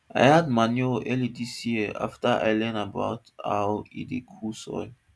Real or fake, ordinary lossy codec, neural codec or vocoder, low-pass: real; none; none; none